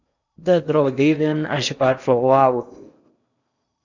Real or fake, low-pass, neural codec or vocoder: fake; 7.2 kHz; codec, 16 kHz in and 24 kHz out, 0.8 kbps, FocalCodec, streaming, 65536 codes